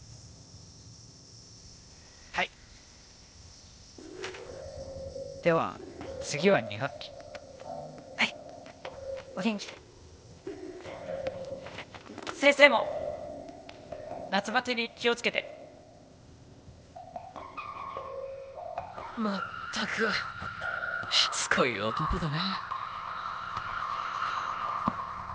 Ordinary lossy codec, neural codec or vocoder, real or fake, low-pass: none; codec, 16 kHz, 0.8 kbps, ZipCodec; fake; none